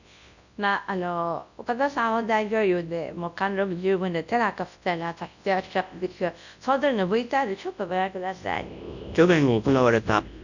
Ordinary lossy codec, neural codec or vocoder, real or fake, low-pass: none; codec, 24 kHz, 0.9 kbps, WavTokenizer, large speech release; fake; 7.2 kHz